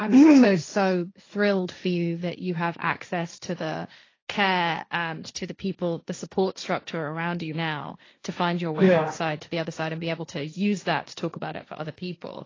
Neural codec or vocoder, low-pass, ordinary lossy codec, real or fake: codec, 16 kHz, 1.1 kbps, Voila-Tokenizer; 7.2 kHz; AAC, 32 kbps; fake